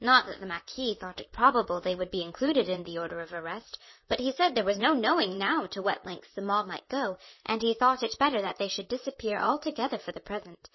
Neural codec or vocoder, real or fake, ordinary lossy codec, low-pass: vocoder, 44.1 kHz, 128 mel bands, Pupu-Vocoder; fake; MP3, 24 kbps; 7.2 kHz